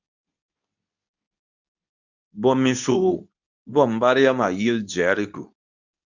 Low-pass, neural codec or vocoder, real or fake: 7.2 kHz; codec, 24 kHz, 0.9 kbps, WavTokenizer, medium speech release version 2; fake